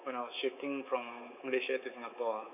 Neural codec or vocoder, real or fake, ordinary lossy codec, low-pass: codec, 24 kHz, 3.1 kbps, DualCodec; fake; none; 3.6 kHz